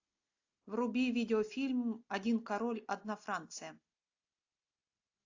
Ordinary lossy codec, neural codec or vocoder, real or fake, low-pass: AAC, 48 kbps; none; real; 7.2 kHz